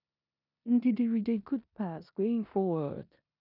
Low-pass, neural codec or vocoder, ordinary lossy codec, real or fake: 5.4 kHz; codec, 16 kHz in and 24 kHz out, 0.9 kbps, LongCat-Audio-Codec, four codebook decoder; none; fake